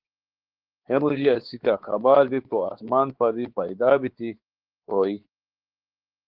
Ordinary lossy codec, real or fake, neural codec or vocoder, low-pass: Opus, 16 kbps; fake; codec, 16 kHz, 4 kbps, X-Codec, WavLM features, trained on Multilingual LibriSpeech; 5.4 kHz